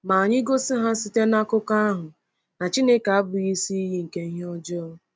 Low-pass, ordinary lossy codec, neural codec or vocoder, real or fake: none; none; none; real